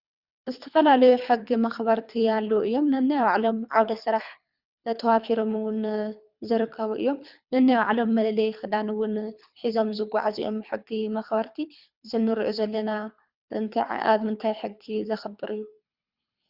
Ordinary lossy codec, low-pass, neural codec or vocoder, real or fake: Opus, 64 kbps; 5.4 kHz; codec, 24 kHz, 3 kbps, HILCodec; fake